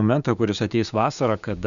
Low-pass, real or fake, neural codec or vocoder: 7.2 kHz; fake; codec, 16 kHz, 6 kbps, DAC